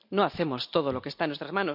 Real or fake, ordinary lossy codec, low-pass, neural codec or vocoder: real; none; 5.4 kHz; none